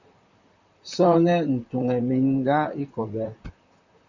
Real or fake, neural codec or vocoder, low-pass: fake; vocoder, 44.1 kHz, 128 mel bands, Pupu-Vocoder; 7.2 kHz